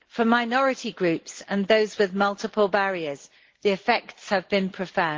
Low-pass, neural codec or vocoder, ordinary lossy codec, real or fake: 7.2 kHz; none; Opus, 16 kbps; real